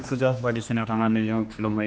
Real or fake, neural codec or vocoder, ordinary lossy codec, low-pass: fake; codec, 16 kHz, 2 kbps, X-Codec, HuBERT features, trained on general audio; none; none